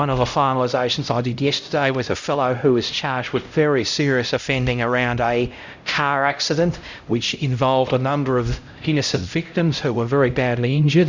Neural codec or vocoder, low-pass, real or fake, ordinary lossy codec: codec, 16 kHz, 0.5 kbps, X-Codec, HuBERT features, trained on LibriSpeech; 7.2 kHz; fake; Opus, 64 kbps